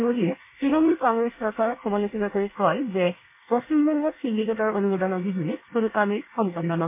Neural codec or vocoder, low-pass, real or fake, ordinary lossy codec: codec, 24 kHz, 1 kbps, SNAC; 3.6 kHz; fake; MP3, 24 kbps